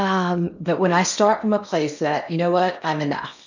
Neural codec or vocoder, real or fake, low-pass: codec, 16 kHz in and 24 kHz out, 0.8 kbps, FocalCodec, streaming, 65536 codes; fake; 7.2 kHz